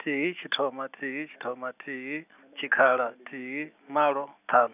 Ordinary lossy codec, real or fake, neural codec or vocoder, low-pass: none; real; none; 3.6 kHz